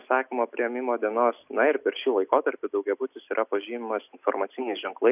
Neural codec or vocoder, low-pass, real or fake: none; 3.6 kHz; real